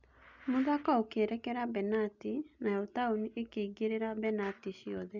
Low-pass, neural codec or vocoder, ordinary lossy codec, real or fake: 7.2 kHz; none; none; real